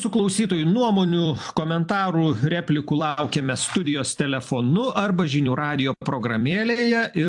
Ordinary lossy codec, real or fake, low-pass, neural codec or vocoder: AAC, 64 kbps; fake; 10.8 kHz; vocoder, 44.1 kHz, 128 mel bands every 256 samples, BigVGAN v2